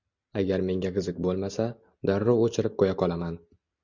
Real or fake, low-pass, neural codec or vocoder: real; 7.2 kHz; none